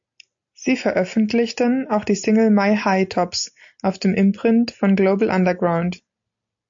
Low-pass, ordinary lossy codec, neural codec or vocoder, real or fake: 7.2 kHz; AAC, 64 kbps; none; real